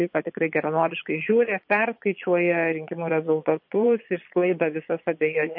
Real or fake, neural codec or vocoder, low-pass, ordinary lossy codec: fake; vocoder, 22.05 kHz, 80 mel bands, Vocos; 5.4 kHz; MP3, 32 kbps